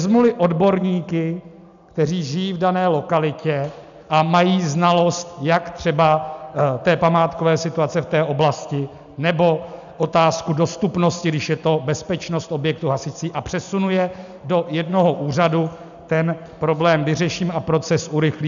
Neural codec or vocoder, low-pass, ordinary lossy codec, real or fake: none; 7.2 kHz; MP3, 96 kbps; real